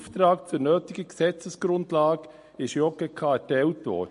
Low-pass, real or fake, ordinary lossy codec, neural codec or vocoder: 10.8 kHz; real; MP3, 48 kbps; none